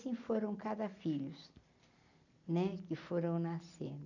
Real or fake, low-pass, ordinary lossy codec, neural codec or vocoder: real; 7.2 kHz; none; none